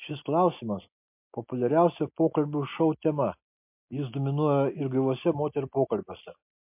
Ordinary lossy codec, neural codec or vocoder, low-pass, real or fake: MP3, 32 kbps; none; 3.6 kHz; real